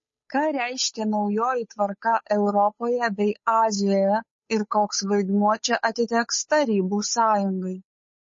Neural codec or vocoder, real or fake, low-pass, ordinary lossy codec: codec, 16 kHz, 8 kbps, FunCodec, trained on Chinese and English, 25 frames a second; fake; 7.2 kHz; MP3, 32 kbps